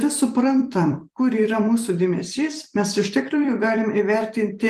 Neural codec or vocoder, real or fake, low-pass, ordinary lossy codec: vocoder, 44.1 kHz, 128 mel bands every 256 samples, BigVGAN v2; fake; 14.4 kHz; Opus, 24 kbps